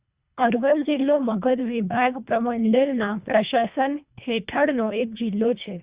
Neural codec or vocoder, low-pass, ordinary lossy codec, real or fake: codec, 24 kHz, 1.5 kbps, HILCodec; 3.6 kHz; Opus, 64 kbps; fake